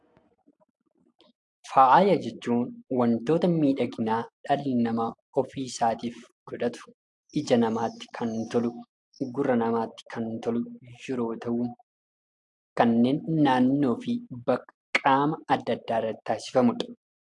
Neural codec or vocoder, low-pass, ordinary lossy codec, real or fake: none; 10.8 kHz; AAC, 64 kbps; real